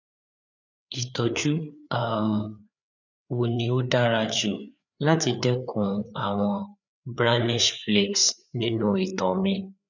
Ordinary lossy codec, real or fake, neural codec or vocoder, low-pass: none; fake; codec, 16 kHz, 4 kbps, FreqCodec, larger model; 7.2 kHz